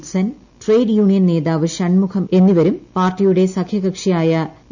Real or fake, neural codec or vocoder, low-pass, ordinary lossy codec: real; none; 7.2 kHz; none